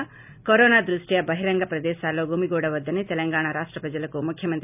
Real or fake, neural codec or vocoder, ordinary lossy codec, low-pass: real; none; none; 3.6 kHz